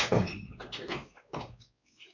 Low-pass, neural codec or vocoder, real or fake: 7.2 kHz; codec, 16 kHz, 2 kbps, X-Codec, WavLM features, trained on Multilingual LibriSpeech; fake